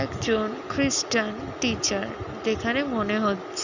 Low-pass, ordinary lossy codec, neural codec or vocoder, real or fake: 7.2 kHz; none; none; real